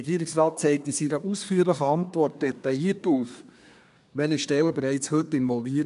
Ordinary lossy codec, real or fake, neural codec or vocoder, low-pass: none; fake; codec, 24 kHz, 1 kbps, SNAC; 10.8 kHz